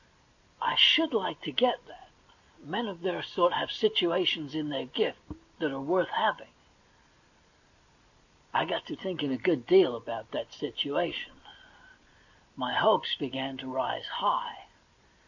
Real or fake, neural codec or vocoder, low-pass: real; none; 7.2 kHz